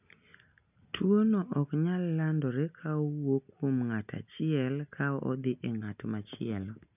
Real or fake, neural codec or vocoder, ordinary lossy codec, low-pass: real; none; none; 3.6 kHz